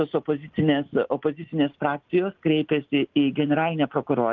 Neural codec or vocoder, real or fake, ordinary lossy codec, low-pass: none; real; Opus, 24 kbps; 7.2 kHz